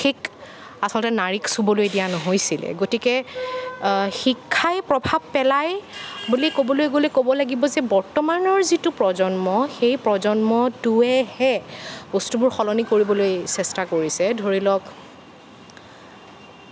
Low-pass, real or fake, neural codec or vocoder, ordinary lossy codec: none; real; none; none